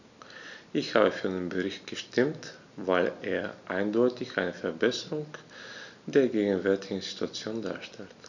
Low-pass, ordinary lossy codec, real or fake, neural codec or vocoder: 7.2 kHz; none; real; none